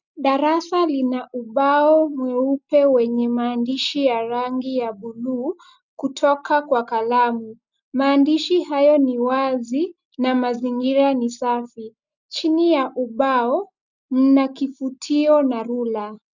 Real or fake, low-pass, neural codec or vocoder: real; 7.2 kHz; none